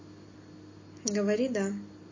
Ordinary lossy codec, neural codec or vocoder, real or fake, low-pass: MP3, 32 kbps; none; real; 7.2 kHz